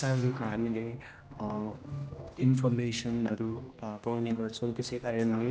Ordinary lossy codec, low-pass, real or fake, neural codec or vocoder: none; none; fake; codec, 16 kHz, 1 kbps, X-Codec, HuBERT features, trained on general audio